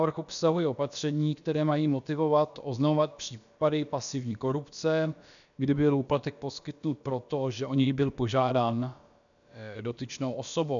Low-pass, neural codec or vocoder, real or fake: 7.2 kHz; codec, 16 kHz, about 1 kbps, DyCAST, with the encoder's durations; fake